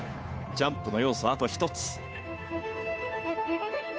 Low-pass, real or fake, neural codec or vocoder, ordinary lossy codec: none; fake; codec, 16 kHz, 2 kbps, FunCodec, trained on Chinese and English, 25 frames a second; none